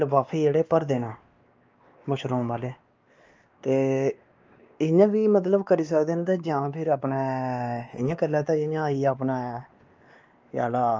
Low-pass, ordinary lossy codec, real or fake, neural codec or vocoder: 7.2 kHz; Opus, 24 kbps; fake; codec, 16 kHz, 2 kbps, X-Codec, WavLM features, trained on Multilingual LibriSpeech